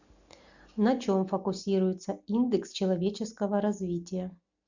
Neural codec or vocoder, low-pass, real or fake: none; 7.2 kHz; real